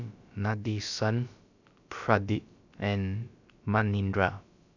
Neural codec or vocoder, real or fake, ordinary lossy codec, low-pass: codec, 16 kHz, about 1 kbps, DyCAST, with the encoder's durations; fake; none; 7.2 kHz